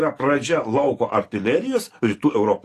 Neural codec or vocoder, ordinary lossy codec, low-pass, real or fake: codec, 44.1 kHz, 7.8 kbps, DAC; AAC, 48 kbps; 14.4 kHz; fake